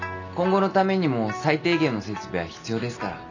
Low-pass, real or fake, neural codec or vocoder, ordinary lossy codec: 7.2 kHz; real; none; none